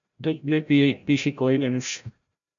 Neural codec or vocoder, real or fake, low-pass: codec, 16 kHz, 0.5 kbps, FreqCodec, larger model; fake; 7.2 kHz